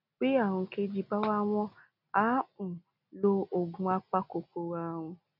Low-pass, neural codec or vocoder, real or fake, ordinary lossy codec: 5.4 kHz; none; real; none